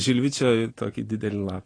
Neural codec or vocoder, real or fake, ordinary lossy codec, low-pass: none; real; AAC, 32 kbps; 9.9 kHz